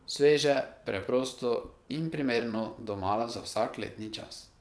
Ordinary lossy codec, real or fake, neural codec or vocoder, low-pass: none; fake; vocoder, 22.05 kHz, 80 mel bands, WaveNeXt; none